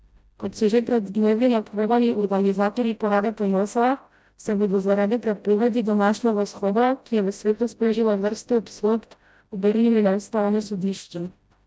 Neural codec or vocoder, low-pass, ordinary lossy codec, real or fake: codec, 16 kHz, 0.5 kbps, FreqCodec, smaller model; none; none; fake